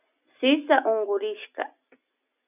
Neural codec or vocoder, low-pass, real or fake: none; 3.6 kHz; real